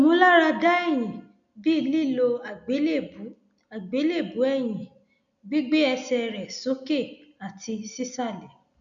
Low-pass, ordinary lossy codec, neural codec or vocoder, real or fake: 7.2 kHz; none; none; real